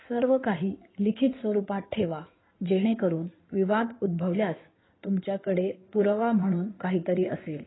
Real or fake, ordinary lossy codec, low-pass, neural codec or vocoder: fake; AAC, 16 kbps; 7.2 kHz; codec, 16 kHz in and 24 kHz out, 2.2 kbps, FireRedTTS-2 codec